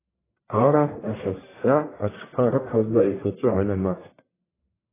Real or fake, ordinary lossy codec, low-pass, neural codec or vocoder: fake; AAC, 16 kbps; 3.6 kHz; codec, 44.1 kHz, 1.7 kbps, Pupu-Codec